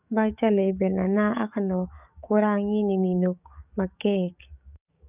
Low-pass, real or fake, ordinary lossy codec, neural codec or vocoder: 3.6 kHz; fake; none; codec, 44.1 kHz, 7.8 kbps, DAC